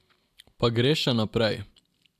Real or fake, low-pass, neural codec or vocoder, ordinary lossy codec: real; 14.4 kHz; none; AAC, 96 kbps